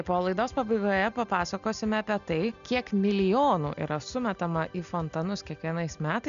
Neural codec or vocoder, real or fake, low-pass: none; real; 7.2 kHz